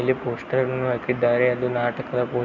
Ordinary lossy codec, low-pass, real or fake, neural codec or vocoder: none; 7.2 kHz; real; none